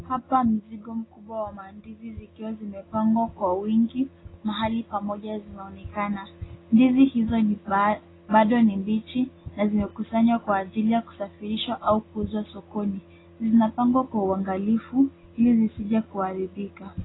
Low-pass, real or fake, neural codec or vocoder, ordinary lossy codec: 7.2 kHz; real; none; AAC, 16 kbps